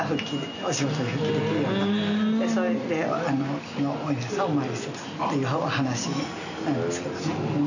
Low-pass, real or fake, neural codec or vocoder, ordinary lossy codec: 7.2 kHz; real; none; none